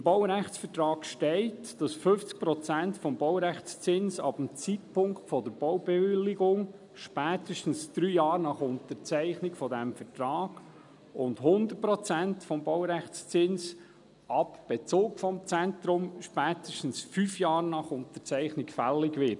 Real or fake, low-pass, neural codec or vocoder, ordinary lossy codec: real; 10.8 kHz; none; none